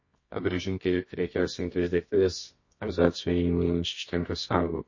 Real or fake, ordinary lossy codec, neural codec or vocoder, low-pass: fake; MP3, 32 kbps; codec, 24 kHz, 0.9 kbps, WavTokenizer, medium music audio release; 7.2 kHz